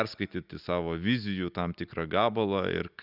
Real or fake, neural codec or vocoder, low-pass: real; none; 5.4 kHz